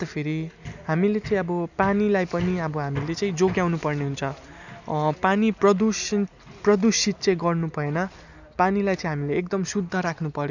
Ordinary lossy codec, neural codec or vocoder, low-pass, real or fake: none; none; 7.2 kHz; real